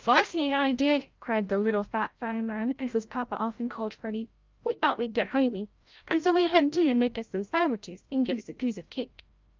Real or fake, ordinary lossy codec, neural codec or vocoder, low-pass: fake; Opus, 24 kbps; codec, 16 kHz, 0.5 kbps, FreqCodec, larger model; 7.2 kHz